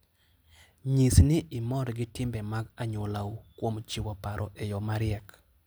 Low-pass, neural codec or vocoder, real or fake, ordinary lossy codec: none; none; real; none